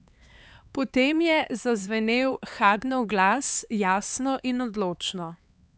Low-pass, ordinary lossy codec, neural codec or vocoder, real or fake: none; none; codec, 16 kHz, 4 kbps, X-Codec, HuBERT features, trained on LibriSpeech; fake